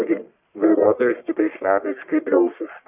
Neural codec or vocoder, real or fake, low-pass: codec, 44.1 kHz, 1.7 kbps, Pupu-Codec; fake; 3.6 kHz